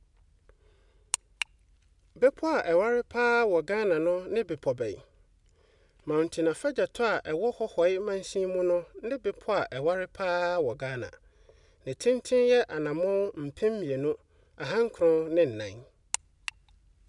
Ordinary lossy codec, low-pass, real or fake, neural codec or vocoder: none; 10.8 kHz; real; none